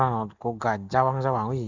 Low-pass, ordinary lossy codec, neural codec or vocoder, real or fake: 7.2 kHz; none; none; real